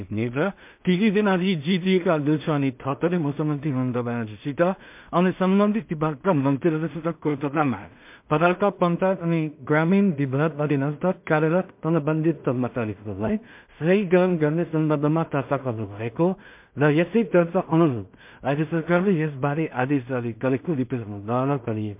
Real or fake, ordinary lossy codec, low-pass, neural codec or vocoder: fake; MP3, 32 kbps; 3.6 kHz; codec, 16 kHz in and 24 kHz out, 0.4 kbps, LongCat-Audio-Codec, two codebook decoder